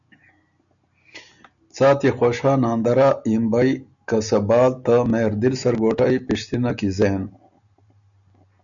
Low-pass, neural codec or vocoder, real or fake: 7.2 kHz; none; real